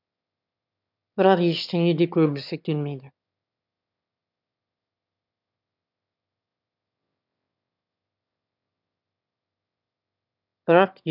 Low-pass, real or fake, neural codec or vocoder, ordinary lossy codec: 5.4 kHz; fake; autoencoder, 22.05 kHz, a latent of 192 numbers a frame, VITS, trained on one speaker; none